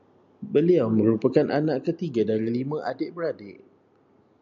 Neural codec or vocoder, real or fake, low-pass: none; real; 7.2 kHz